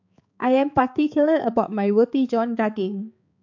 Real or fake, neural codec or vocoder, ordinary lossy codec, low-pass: fake; codec, 16 kHz, 4 kbps, X-Codec, HuBERT features, trained on balanced general audio; AAC, 48 kbps; 7.2 kHz